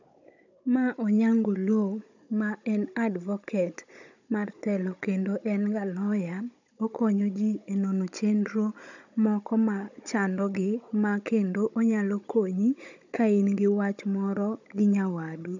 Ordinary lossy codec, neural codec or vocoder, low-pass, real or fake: none; codec, 16 kHz, 16 kbps, FunCodec, trained on Chinese and English, 50 frames a second; 7.2 kHz; fake